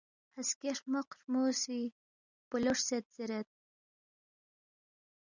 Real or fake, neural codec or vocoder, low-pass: real; none; 7.2 kHz